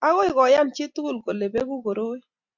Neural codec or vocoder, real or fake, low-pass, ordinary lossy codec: none; real; 7.2 kHz; Opus, 64 kbps